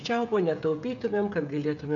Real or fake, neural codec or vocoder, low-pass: fake; codec, 16 kHz, 16 kbps, FreqCodec, smaller model; 7.2 kHz